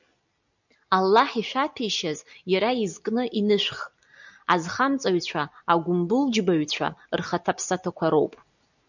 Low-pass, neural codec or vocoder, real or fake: 7.2 kHz; none; real